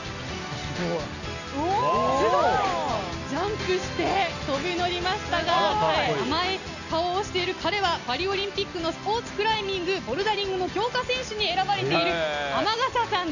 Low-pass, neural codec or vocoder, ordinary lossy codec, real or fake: 7.2 kHz; none; none; real